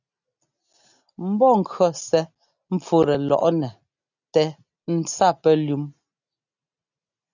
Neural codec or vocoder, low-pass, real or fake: none; 7.2 kHz; real